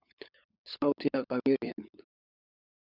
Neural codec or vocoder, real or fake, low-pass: codec, 16 kHz, 16 kbps, FunCodec, trained on LibriTTS, 50 frames a second; fake; 5.4 kHz